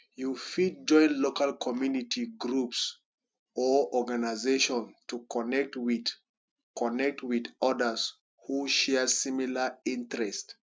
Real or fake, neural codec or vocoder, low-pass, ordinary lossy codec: real; none; none; none